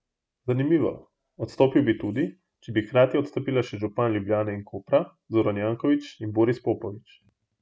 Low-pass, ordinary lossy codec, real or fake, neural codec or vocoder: none; none; real; none